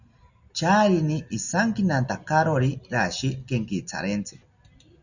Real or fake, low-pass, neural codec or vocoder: real; 7.2 kHz; none